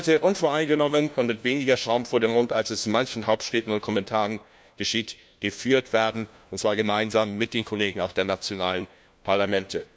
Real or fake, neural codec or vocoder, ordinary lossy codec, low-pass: fake; codec, 16 kHz, 1 kbps, FunCodec, trained on LibriTTS, 50 frames a second; none; none